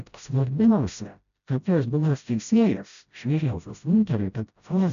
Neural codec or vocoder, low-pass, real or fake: codec, 16 kHz, 0.5 kbps, FreqCodec, smaller model; 7.2 kHz; fake